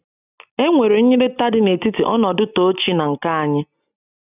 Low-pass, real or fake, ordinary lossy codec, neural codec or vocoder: 3.6 kHz; real; none; none